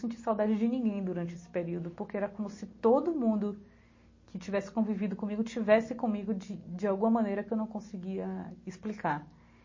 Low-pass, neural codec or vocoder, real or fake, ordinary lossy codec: 7.2 kHz; none; real; MP3, 32 kbps